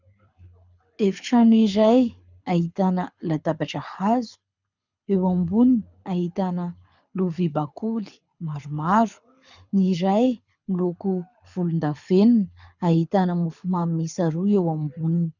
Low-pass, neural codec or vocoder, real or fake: 7.2 kHz; codec, 24 kHz, 6 kbps, HILCodec; fake